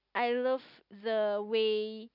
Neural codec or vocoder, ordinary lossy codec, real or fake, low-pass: none; none; real; 5.4 kHz